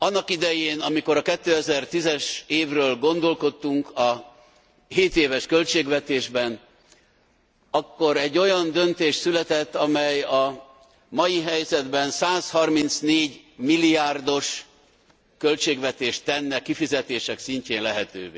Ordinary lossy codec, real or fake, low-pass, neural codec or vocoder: none; real; none; none